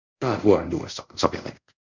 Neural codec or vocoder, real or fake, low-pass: codec, 24 kHz, 0.5 kbps, DualCodec; fake; 7.2 kHz